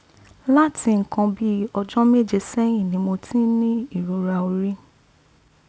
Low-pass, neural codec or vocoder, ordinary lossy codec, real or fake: none; none; none; real